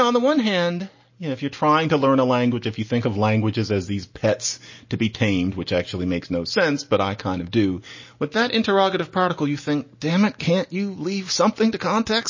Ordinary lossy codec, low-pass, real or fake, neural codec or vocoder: MP3, 32 kbps; 7.2 kHz; real; none